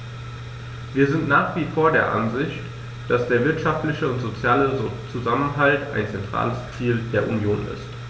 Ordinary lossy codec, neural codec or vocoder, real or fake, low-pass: none; none; real; none